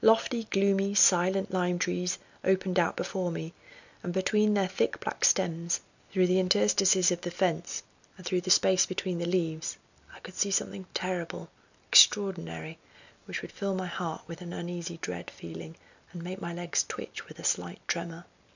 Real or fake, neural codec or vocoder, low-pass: real; none; 7.2 kHz